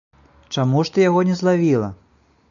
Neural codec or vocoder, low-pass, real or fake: none; 7.2 kHz; real